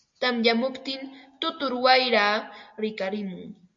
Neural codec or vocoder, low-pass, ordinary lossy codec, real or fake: none; 7.2 kHz; MP3, 48 kbps; real